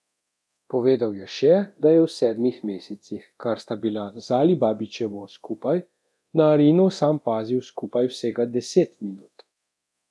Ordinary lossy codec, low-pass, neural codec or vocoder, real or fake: none; none; codec, 24 kHz, 0.9 kbps, DualCodec; fake